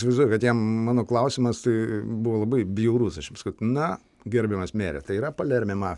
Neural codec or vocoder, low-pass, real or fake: none; 10.8 kHz; real